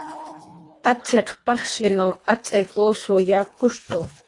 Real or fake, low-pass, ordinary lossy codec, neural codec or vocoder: fake; 10.8 kHz; AAC, 48 kbps; codec, 24 kHz, 1.5 kbps, HILCodec